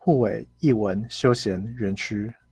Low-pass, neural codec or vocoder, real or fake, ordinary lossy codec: 10.8 kHz; none; real; Opus, 16 kbps